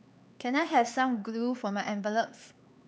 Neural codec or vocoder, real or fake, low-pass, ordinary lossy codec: codec, 16 kHz, 4 kbps, X-Codec, HuBERT features, trained on LibriSpeech; fake; none; none